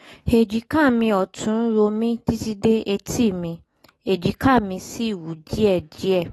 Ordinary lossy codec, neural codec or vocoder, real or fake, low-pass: AAC, 32 kbps; autoencoder, 48 kHz, 128 numbers a frame, DAC-VAE, trained on Japanese speech; fake; 19.8 kHz